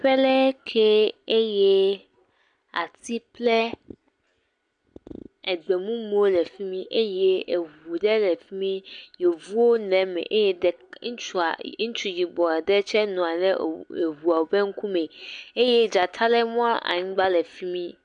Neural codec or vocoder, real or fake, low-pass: none; real; 9.9 kHz